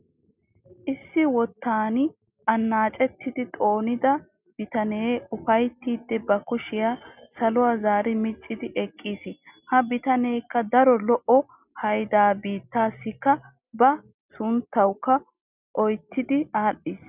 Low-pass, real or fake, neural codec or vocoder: 3.6 kHz; real; none